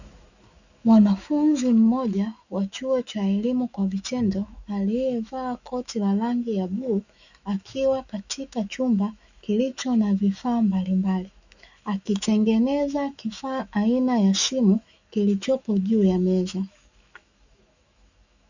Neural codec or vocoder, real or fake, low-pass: autoencoder, 48 kHz, 128 numbers a frame, DAC-VAE, trained on Japanese speech; fake; 7.2 kHz